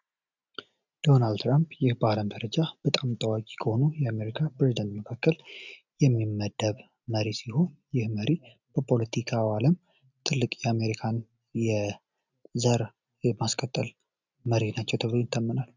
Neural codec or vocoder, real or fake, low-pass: none; real; 7.2 kHz